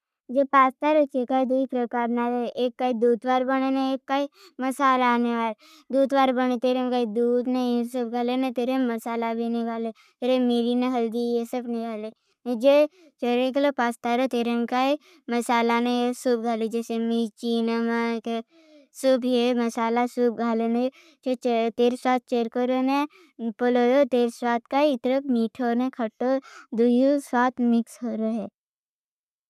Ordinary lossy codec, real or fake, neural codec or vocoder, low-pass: none; fake; codec, 44.1 kHz, 7.8 kbps, Pupu-Codec; 14.4 kHz